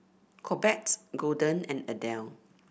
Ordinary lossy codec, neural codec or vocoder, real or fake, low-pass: none; none; real; none